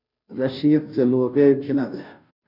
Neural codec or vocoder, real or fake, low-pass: codec, 16 kHz, 0.5 kbps, FunCodec, trained on Chinese and English, 25 frames a second; fake; 5.4 kHz